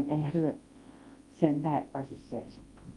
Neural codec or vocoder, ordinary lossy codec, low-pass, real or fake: codec, 24 kHz, 0.9 kbps, WavTokenizer, large speech release; Opus, 32 kbps; 10.8 kHz; fake